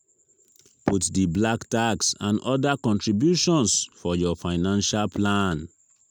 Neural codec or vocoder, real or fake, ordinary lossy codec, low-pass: none; real; none; none